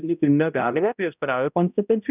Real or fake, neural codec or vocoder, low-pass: fake; codec, 16 kHz, 0.5 kbps, X-Codec, HuBERT features, trained on balanced general audio; 3.6 kHz